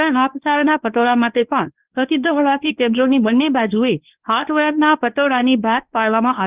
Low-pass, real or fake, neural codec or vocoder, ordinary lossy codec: 3.6 kHz; fake; codec, 24 kHz, 0.9 kbps, WavTokenizer, medium speech release version 1; Opus, 24 kbps